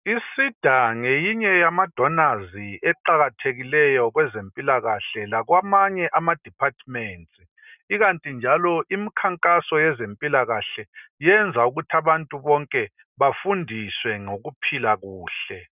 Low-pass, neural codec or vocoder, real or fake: 3.6 kHz; none; real